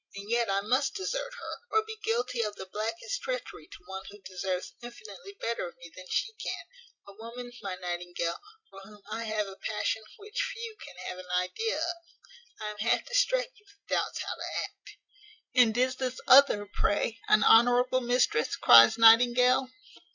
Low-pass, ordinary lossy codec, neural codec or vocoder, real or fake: 7.2 kHz; Opus, 64 kbps; none; real